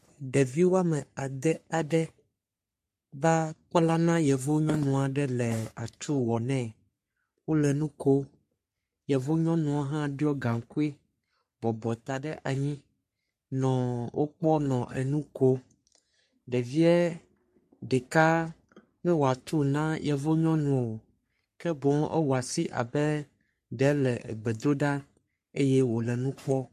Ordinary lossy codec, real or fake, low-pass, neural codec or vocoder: MP3, 64 kbps; fake; 14.4 kHz; codec, 44.1 kHz, 3.4 kbps, Pupu-Codec